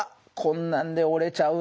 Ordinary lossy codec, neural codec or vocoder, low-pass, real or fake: none; none; none; real